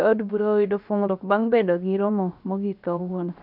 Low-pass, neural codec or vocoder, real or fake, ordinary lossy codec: 5.4 kHz; codec, 16 kHz, about 1 kbps, DyCAST, with the encoder's durations; fake; none